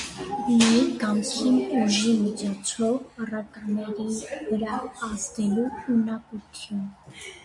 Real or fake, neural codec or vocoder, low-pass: real; none; 10.8 kHz